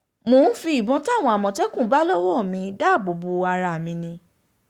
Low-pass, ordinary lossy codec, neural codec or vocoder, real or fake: 19.8 kHz; Opus, 64 kbps; codec, 44.1 kHz, 7.8 kbps, Pupu-Codec; fake